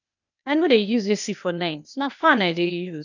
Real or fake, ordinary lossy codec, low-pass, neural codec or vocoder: fake; none; 7.2 kHz; codec, 16 kHz, 0.8 kbps, ZipCodec